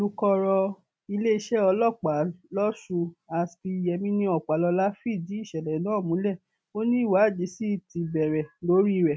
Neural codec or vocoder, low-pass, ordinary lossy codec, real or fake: none; none; none; real